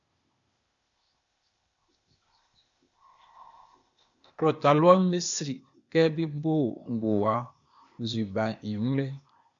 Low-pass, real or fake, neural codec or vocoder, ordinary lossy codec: 7.2 kHz; fake; codec, 16 kHz, 0.8 kbps, ZipCodec; MP3, 64 kbps